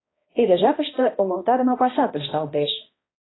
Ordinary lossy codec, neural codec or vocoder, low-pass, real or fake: AAC, 16 kbps; codec, 16 kHz, 1 kbps, X-Codec, HuBERT features, trained on balanced general audio; 7.2 kHz; fake